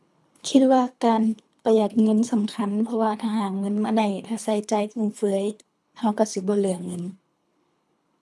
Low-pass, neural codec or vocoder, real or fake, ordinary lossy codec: none; codec, 24 kHz, 3 kbps, HILCodec; fake; none